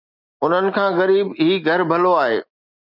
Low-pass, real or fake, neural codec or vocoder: 5.4 kHz; real; none